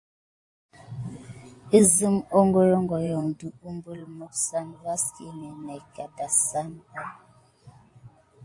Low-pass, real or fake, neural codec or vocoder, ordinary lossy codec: 10.8 kHz; fake; vocoder, 24 kHz, 100 mel bands, Vocos; AAC, 48 kbps